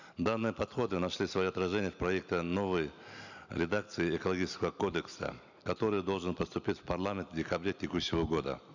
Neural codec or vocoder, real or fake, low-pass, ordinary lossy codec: none; real; 7.2 kHz; none